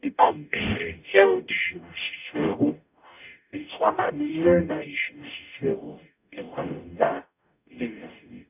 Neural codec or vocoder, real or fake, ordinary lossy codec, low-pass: codec, 44.1 kHz, 0.9 kbps, DAC; fake; none; 3.6 kHz